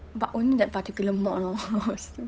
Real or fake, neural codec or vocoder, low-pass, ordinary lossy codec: fake; codec, 16 kHz, 8 kbps, FunCodec, trained on Chinese and English, 25 frames a second; none; none